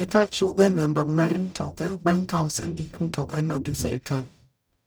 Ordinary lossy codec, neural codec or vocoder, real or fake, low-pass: none; codec, 44.1 kHz, 0.9 kbps, DAC; fake; none